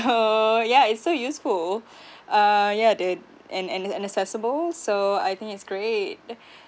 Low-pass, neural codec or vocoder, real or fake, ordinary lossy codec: none; none; real; none